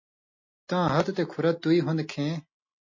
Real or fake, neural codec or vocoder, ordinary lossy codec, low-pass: real; none; MP3, 32 kbps; 7.2 kHz